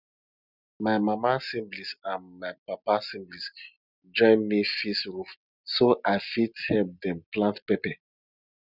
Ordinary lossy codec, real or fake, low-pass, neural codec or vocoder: none; real; 5.4 kHz; none